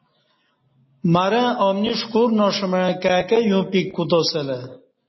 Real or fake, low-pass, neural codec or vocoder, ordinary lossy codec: real; 7.2 kHz; none; MP3, 24 kbps